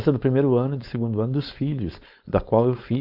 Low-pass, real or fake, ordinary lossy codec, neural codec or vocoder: 5.4 kHz; fake; AAC, 48 kbps; codec, 16 kHz, 4.8 kbps, FACodec